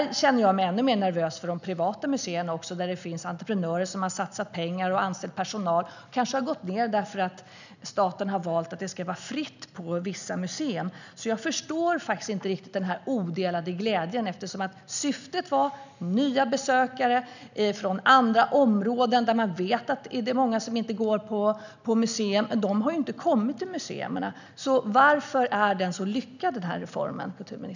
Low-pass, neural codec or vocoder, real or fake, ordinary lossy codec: 7.2 kHz; none; real; none